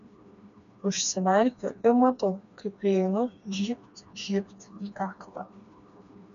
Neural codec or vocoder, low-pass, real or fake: codec, 16 kHz, 2 kbps, FreqCodec, smaller model; 7.2 kHz; fake